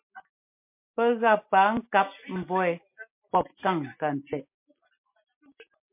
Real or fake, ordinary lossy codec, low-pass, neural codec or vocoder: real; MP3, 32 kbps; 3.6 kHz; none